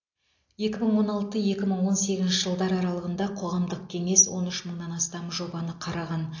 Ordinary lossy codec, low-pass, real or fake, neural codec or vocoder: AAC, 48 kbps; 7.2 kHz; real; none